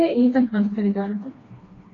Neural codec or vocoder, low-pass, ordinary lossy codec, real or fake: codec, 16 kHz, 2 kbps, FreqCodec, smaller model; 7.2 kHz; AAC, 32 kbps; fake